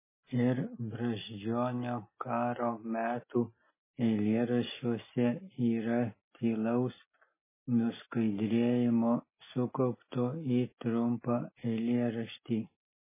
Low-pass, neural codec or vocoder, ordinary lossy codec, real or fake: 3.6 kHz; none; MP3, 16 kbps; real